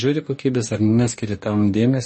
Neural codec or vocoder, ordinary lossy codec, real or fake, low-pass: codec, 44.1 kHz, 2.6 kbps, DAC; MP3, 32 kbps; fake; 10.8 kHz